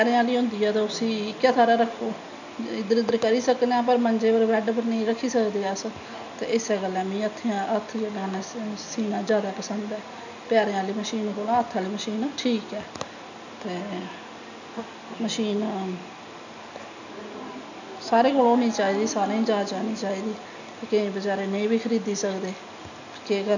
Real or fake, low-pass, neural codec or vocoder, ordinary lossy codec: real; 7.2 kHz; none; none